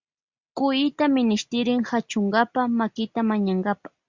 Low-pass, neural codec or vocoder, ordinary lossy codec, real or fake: 7.2 kHz; none; Opus, 64 kbps; real